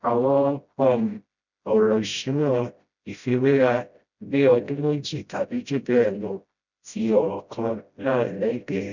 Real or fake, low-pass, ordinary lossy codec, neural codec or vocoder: fake; 7.2 kHz; none; codec, 16 kHz, 0.5 kbps, FreqCodec, smaller model